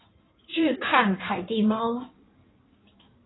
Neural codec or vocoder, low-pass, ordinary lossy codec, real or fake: codec, 16 kHz in and 24 kHz out, 1.1 kbps, FireRedTTS-2 codec; 7.2 kHz; AAC, 16 kbps; fake